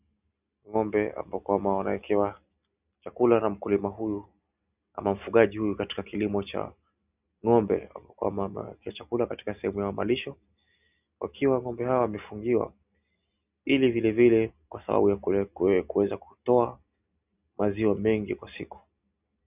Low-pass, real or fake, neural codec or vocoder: 3.6 kHz; real; none